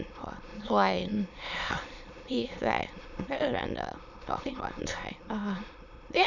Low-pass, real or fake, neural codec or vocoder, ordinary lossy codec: 7.2 kHz; fake; autoencoder, 22.05 kHz, a latent of 192 numbers a frame, VITS, trained on many speakers; none